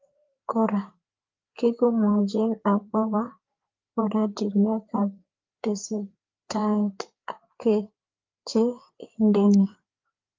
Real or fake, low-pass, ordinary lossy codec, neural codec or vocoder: fake; 7.2 kHz; Opus, 24 kbps; codec, 16 kHz, 4 kbps, FreqCodec, larger model